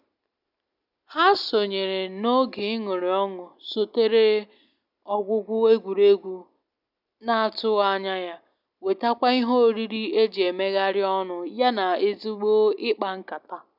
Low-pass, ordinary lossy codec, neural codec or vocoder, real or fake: 5.4 kHz; none; none; real